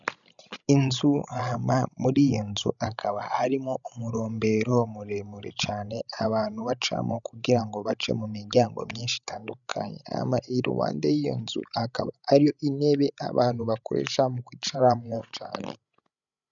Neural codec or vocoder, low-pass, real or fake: codec, 16 kHz, 16 kbps, FreqCodec, larger model; 7.2 kHz; fake